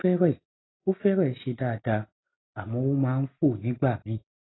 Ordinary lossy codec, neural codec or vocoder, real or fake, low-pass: AAC, 16 kbps; none; real; 7.2 kHz